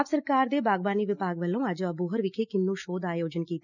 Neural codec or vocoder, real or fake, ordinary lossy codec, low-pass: none; real; none; 7.2 kHz